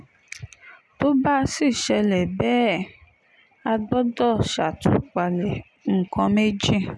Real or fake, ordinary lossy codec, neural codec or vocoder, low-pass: real; none; none; none